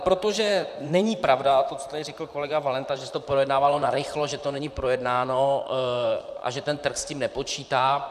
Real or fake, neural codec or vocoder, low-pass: fake; vocoder, 44.1 kHz, 128 mel bands, Pupu-Vocoder; 14.4 kHz